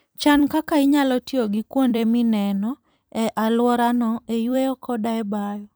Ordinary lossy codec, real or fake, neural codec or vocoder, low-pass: none; fake; vocoder, 44.1 kHz, 128 mel bands every 256 samples, BigVGAN v2; none